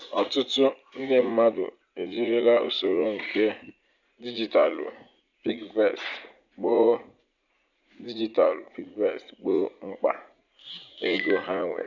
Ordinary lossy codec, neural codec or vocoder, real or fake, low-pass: none; vocoder, 44.1 kHz, 80 mel bands, Vocos; fake; 7.2 kHz